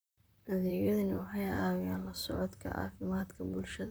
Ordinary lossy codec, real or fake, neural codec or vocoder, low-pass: none; fake; vocoder, 44.1 kHz, 128 mel bands, Pupu-Vocoder; none